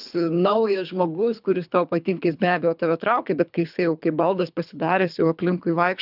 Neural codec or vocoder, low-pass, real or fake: codec, 24 kHz, 3 kbps, HILCodec; 5.4 kHz; fake